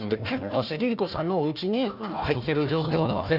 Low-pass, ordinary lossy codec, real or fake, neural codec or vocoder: 5.4 kHz; none; fake; codec, 16 kHz, 1 kbps, FunCodec, trained on Chinese and English, 50 frames a second